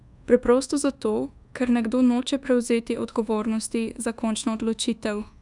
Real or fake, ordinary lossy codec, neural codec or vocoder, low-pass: fake; none; codec, 24 kHz, 1.2 kbps, DualCodec; 10.8 kHz